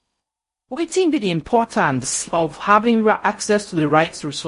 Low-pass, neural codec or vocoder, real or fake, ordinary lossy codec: 10.8 kHz; codec, 16 kHz in and 24 kHz out, 0.6 kbps, FocalCodec, streaming, 4096 codes; fake; MP3, 48 kbps